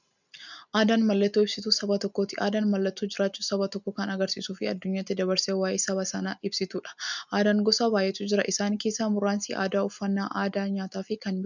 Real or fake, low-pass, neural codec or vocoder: real; 7.2 kHz; none